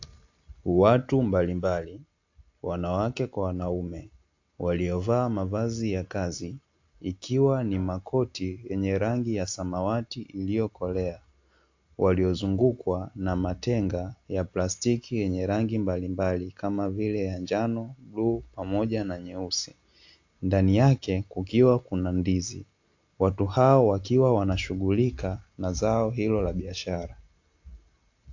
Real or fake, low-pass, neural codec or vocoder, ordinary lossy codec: real; 7.2 kHz; none; AAC, 48 kbps